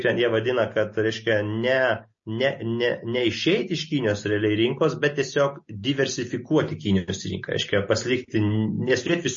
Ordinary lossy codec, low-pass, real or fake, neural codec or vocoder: MP3, 32 kbps; 7.2 kHz; real; none